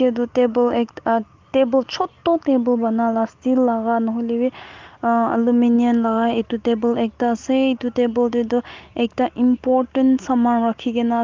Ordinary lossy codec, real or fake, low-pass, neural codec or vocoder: Opus, 32 kbps; real; 7.2 kHz; none